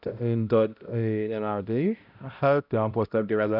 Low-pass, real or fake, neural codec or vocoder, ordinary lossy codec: 5.4 kHz; fake; codec, 16 kHz, 0.5 kbps, X-Codec, HuBERT features, trained on balanced general audio; none